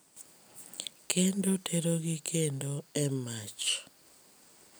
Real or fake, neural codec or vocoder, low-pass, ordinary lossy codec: real; none; none; none